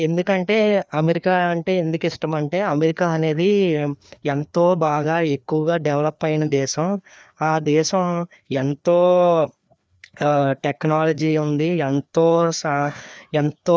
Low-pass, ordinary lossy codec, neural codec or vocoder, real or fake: none; none; codec, 16 kHz, 2 kbps, FreqCodec, larger model; fake